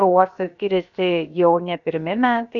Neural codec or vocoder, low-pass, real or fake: codec, 16 kHz, about 1 kbps, DyCAST, with the encoder's durations; 7.2 kHz; fake